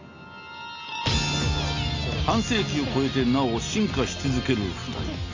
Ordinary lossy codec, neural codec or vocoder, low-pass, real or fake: MP3, 48 kbps; none; 7.2 kHz; real